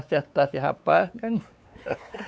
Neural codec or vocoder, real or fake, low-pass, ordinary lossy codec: codec, 16 kHz, 4 kbps, X-Codec, WavLM features, trained on Multilingual LibriSpeech; fake; none; none